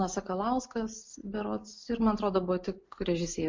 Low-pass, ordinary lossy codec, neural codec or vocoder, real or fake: 7.2 kHz; MP3, 48 kbps; none; real